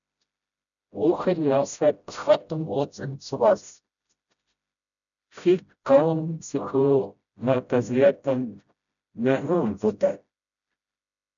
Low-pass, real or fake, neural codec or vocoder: 7.2 kHz; fake; codec, 16 kHz, 0.5 kbps, FreqCodec, smaller model